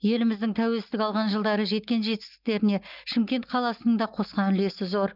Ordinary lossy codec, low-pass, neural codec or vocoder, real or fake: Opus, 24 kbps; 5.4 kHz; none; real